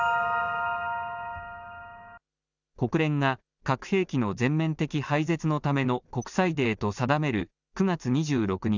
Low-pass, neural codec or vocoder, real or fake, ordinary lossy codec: 7.2 kHz; none; real; none